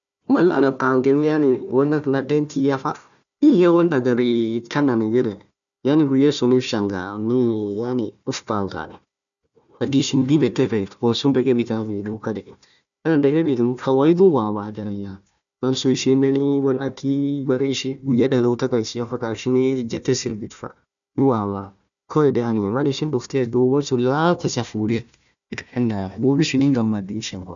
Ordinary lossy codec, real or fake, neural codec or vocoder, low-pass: none; fake; codec, 16 kHz, 1 kbps, FunCodec, trained on Chinese and English, 50 frames a second; 7.2 kHz